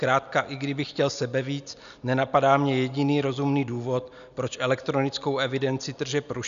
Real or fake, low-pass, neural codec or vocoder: real; 7.2 kHz; none